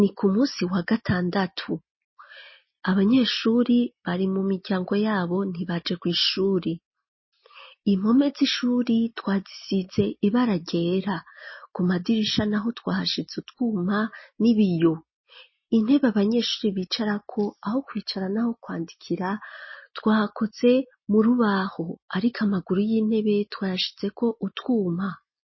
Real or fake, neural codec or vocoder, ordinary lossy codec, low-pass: real; none; MP3, 24 kbps; 7.2 kHz